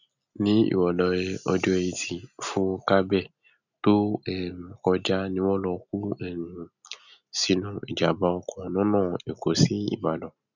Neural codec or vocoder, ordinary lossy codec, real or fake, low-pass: none; none; real; 7.2 kHz